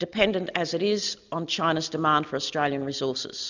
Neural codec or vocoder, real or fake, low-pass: none; real; 7.2 kHz